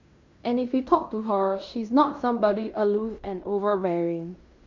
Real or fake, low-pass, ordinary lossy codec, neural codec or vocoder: fake; 7.2 kHz; MP3, 48 kbps; codec, 16 kHz in and 24 kHz out, 0.9 kbps, LongCat-Audio-Codec, fine tuned four codebook decoder